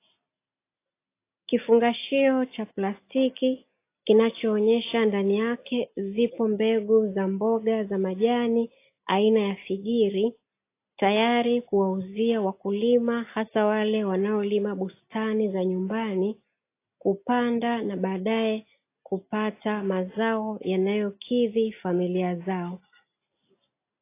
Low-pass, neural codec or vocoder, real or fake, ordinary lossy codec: 3.6 kHz; none; real; AAC, 24 kbps